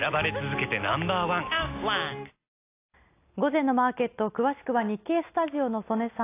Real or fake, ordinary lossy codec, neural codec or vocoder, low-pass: real; AAC, 24 kbps; none; 3.6 kHz